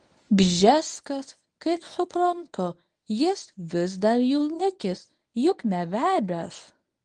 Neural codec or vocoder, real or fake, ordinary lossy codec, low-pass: codec, 24 kHz, 0.9 kbps, WavTokenizer, medium speech release version 1; fake; Opus, 32 kbps; 10.8 kHz